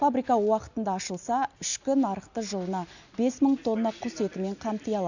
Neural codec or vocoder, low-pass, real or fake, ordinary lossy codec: none; 7.2 kHz; real; none